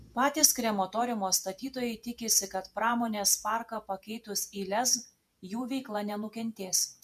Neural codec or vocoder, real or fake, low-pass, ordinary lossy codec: vocoder, 48 kHz, 128 mel bands, Vocos; fake; 14.4 kHz; MP3, 96 kbps